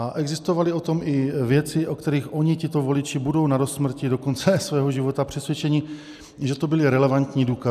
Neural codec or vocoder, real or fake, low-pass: none; real; 14.4 kHz